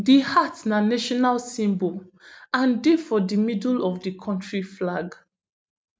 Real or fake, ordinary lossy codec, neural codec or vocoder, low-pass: real; none; none; none